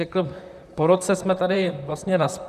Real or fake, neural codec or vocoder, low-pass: fake; vocoder, 44.1 kHz, 128 mel bands, Pupu-Vocoder; 14.4 kHz